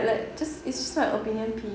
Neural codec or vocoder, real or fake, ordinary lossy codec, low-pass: none; real; none; none